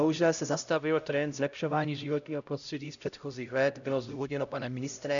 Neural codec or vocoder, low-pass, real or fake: codec, 16 kHz, 0.5 kbps, X-Codec, HuBERT features, trained on LibriSpeech; 7.2 kHz; fake